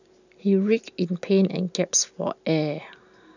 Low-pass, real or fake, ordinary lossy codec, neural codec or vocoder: 7.2 kHz; real; none; none